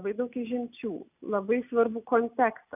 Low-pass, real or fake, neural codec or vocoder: 3.6 kHz; real; none